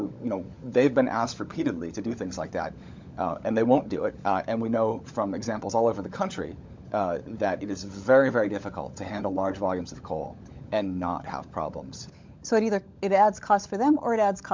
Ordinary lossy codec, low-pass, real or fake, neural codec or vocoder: MP3, 64 kbps; 7.2 kHz; fake; codec, 16 kHz, 16 kbps, FunCodec, trained on LibriTTS, 50 frames a second